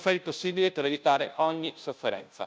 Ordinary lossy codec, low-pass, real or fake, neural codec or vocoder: none; none; fake; codec, 16 kHz, 0.5 kbps, FunCodec, trained on Chinese and English, 25 frames a second